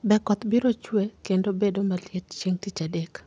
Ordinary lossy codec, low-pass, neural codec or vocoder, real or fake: none; 9.9 kHz; none; real